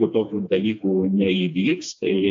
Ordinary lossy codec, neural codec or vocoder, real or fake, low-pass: AAC, 64 kbps; codec, 16 kHz, 2 kbps, FreqCodec, smaller model; fake; 7.2 kHz